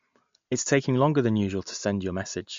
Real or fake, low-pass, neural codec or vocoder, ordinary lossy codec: real; 7.2 kHz; none; MP3, 48 kbps